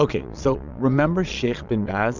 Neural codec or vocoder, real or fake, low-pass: vocoder, 22.05 kHz, 80 mel bands, Vocos; fake; 7.2 kHz